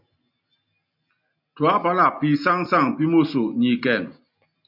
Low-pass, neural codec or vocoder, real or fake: 5.4 kHz; none; real